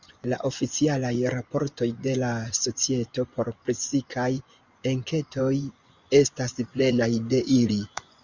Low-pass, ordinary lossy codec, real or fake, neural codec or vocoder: 7.2 kHz; Opus, 64 kbps; fake; vocoder, 24 kHz, 100 mel bands, Vocos